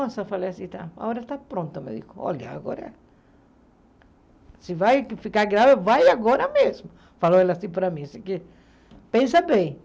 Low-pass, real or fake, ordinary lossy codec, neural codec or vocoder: none; real; none; none